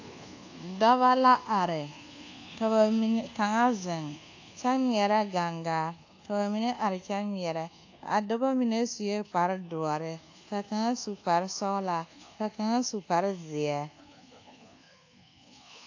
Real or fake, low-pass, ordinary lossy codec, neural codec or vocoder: fake; 7.2 kHz; AAC, 48 kbps; codec, 24 kHz, 1.2 kbps, DualCodec